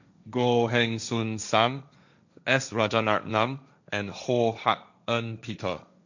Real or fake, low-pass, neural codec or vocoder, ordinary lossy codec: fake; 7.2 kHz; codec, 16 kHz, 1.1 kbps, Voila-Tokenizer; none